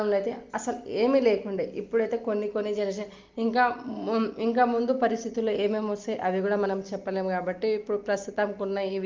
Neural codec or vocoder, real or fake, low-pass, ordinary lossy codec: none; real; 7.2 kHz; Opus, 32 kbps